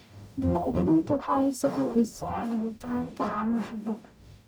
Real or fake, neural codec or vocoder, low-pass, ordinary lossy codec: fake; codec, 44.1 kHz, 0.9 kbps, DAC; none; none